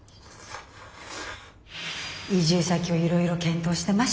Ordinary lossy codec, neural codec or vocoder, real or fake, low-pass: none; none; real; none